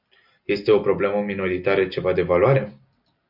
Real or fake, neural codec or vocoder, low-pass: real; none; 5.4 kHz